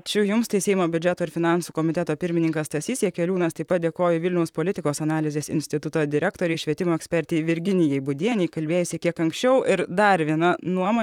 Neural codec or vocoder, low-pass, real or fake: vocoder, 44.1 kHz, 128 mel bands, Pupu-Vocoder; 19.8 kHz; fake